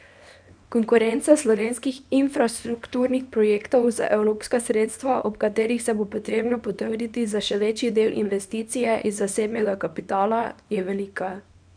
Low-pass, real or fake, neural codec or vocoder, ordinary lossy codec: 9.9 kHz; fake; codec, 24 kHz, 0.9 kbps, WavTokenizer, small release; none